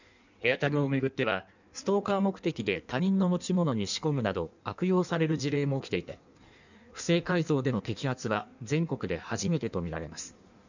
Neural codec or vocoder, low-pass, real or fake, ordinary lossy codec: codec, 16 kHz in and 24 kHz out, 1.1 kbps, FireRedTTS-2 codec; 7.2 kHz; fake; none